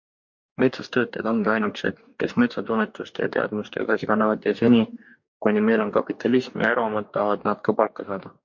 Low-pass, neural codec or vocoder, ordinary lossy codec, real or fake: 7.2 kHz; codec, 44.1 kHz, 2.6 kbps, DAC; MP3, 48 kbps; fake